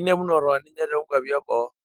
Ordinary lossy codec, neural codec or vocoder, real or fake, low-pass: Opus, 24 kbps; autoencoder, 48 kHz, 128 numbers a frame, DAC-VAE, trained on Japanese speech; fake; 19.8 kHz